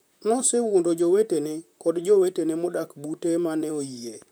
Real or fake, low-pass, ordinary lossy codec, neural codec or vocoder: fake; none; none; vocoder, 44.1 kHz, 128 mel bands, Pupu-Vocoder